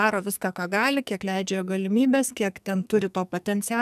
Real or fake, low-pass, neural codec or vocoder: fake; 14.4 kHz; codec, 44.1 kHz, 2.6 kbps, SNAC